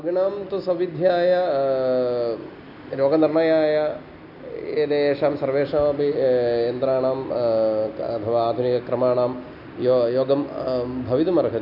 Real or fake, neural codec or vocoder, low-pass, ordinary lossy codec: real; none; 5.4 kHz; none